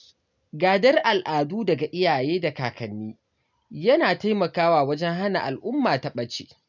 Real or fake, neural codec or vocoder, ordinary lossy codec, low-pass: real; none; none; 7.2 kHz